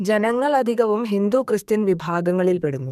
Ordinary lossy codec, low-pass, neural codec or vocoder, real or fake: none; 14.4 kHz; codec, 32 kHz, 1.9 kbps, SNAC; fake